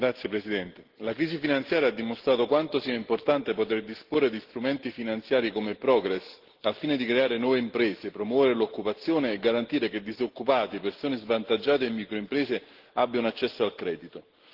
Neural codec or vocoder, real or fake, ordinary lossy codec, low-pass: none; real; Opus, 16 kbps; 5.4 kHz